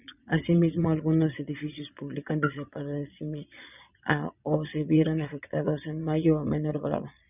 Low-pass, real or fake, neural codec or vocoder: 3.6 kHz; fake; vocoder, 22.05 kHz, 80 mel bands, Vocos